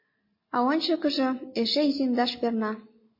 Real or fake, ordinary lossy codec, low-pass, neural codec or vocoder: real; MP3, 24 kbps; 5.4 kHz; none